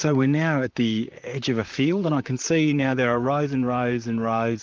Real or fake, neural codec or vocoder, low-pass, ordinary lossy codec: fake; vocoder, 44.1 kHz, 128 mel bands, Pupu-Vocoder; 7.2 kHz; Opus, 24 kbps